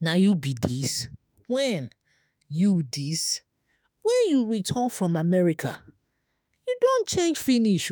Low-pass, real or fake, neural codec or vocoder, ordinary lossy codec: none; fake; autoencoder, 48 kHz, 32 numbers a frame, DAC-VAE, trained on Japanese speech; none